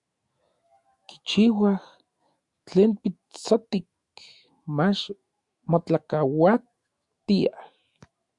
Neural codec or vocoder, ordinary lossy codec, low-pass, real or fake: autoencoder, 48 kHz, 128 numbers a frame, DAC-VAE, trained on Japanese speech; Opus, 64 kbps; 10.8 kHz; fake